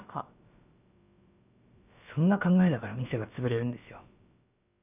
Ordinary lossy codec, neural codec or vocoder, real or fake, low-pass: none; codec, 16 kHz, about 1 kbps, DyCAST, with the encoder's durations; fake; 3.6 kHz